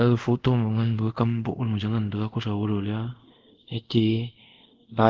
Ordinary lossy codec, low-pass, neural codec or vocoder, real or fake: Opus, 16 kbps; 7.2 kHz; codec, 24 kHz, 0.5 kbps, DualCodec; fake